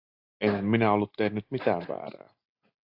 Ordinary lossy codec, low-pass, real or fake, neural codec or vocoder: AAC, 48 kbps; 5.4 kHz; real; none